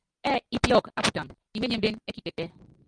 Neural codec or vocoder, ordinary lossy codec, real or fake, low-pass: none; Opus, 32 kbps; real; 9.9 kHz